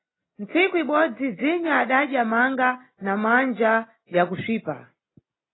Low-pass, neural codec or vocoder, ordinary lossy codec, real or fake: 7.2 kHz; none; AAC, 16 kbps; real